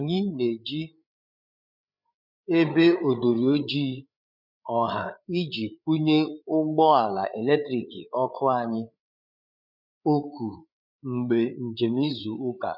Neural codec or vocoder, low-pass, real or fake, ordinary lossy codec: codec, 16 kHz, 8 kbps, FreqCodec, larger model; 5.4 kHz; fake; none